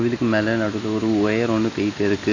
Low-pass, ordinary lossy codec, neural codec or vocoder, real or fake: 7.2 kHz; AAC, 32 kbps; none; real